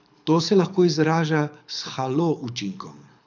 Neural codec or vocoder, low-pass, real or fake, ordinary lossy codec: codec, 24 kHz, 6 kbps, HILCodec; 7.2 kHz; fake; none